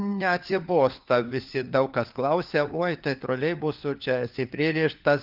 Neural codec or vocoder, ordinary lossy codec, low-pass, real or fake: codec, 16 kHz, 4 kbps, FunCodec, trained on LibriTTS, 50 frames a second; Opus, 32 kbps; 5.4 kHz; fake